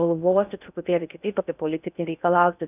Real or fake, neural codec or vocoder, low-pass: fake; codec, 16 kHz in and 24 kHz out, 0.6 kbps, FocalCodec, streaming, 2048 codes; 3.6 kHz